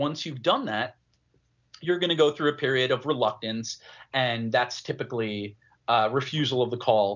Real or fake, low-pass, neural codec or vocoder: real; 7.2 kHz; none